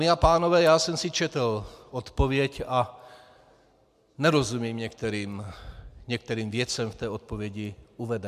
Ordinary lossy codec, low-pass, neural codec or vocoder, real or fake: MP3, 96 kbps; 14.4 kHz; none; real